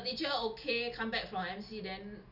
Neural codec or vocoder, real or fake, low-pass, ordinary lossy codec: none; real; 5.4 kHz; none